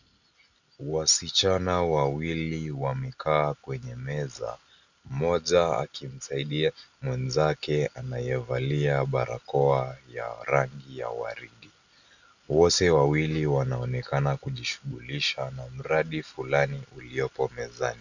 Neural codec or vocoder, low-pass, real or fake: none; 7.2 kHz; real